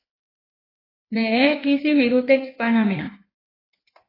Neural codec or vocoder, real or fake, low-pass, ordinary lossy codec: codec, 16 kHz in and 24 kHz out, 1.1 kbps, FireRedTTS-2 codec; fake; 5.4 kHz; AAC, 24 kbps